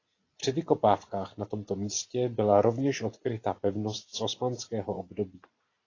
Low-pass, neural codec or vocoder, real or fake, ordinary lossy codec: 7.2 kHz; none; real; AAC, 32 kbps